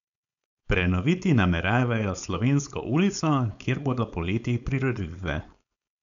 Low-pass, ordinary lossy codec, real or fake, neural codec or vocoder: 7.2 kHz; none; fake; codec, 16 kHz, 4.8 kbps, FACodec